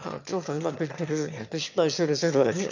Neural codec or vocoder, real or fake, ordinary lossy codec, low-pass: autoencoder, 22.05 kHz, a latent of 192 numbers a frame, VITS, trained on one speaker; fake; none; 7.2 kHz